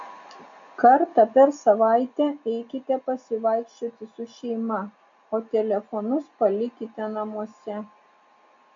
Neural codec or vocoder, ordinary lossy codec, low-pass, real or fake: none; MP3, 64 kbps; 7.2 kHz; real